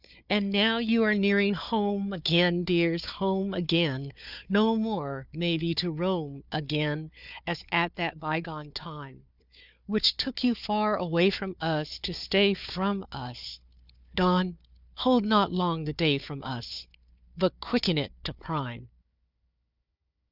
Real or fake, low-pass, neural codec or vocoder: fake; 5.4 kHz; codec, 16 kHz, 4 kbps, FunCodec, trained on Chinese and English, 50 frames a second